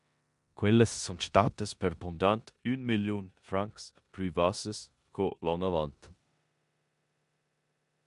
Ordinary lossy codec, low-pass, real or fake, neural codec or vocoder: MP3, 64 kbps; 10.8 kHz; fake; codec, 16 kHz in and 24 kHz out, 0.9 kbps, LongCat-Audio-Codec, four codebook decoder